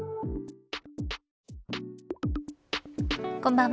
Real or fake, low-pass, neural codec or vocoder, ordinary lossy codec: real; none; none; none